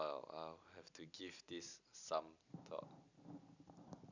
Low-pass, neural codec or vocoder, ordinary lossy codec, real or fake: 7.2 kHz; none; none; real